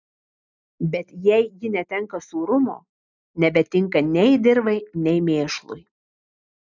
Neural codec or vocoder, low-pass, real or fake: none; 7.2 kHz; real